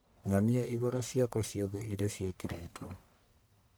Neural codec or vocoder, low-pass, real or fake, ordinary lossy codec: codec, 44.1 kHz, 1.7 kbps, Pupu-Codec; none; fake; none